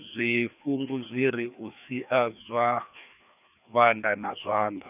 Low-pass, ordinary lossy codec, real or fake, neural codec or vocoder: 3.6 kHz; none; fake; codec, 16 kHz, 2 kbps, FreqCodec, larger model